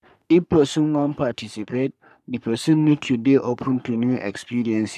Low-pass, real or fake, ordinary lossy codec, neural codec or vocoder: 14.4 kHz; fake; none; codec, 44.1 kHz, 3.4 kbps, Pupu-Codec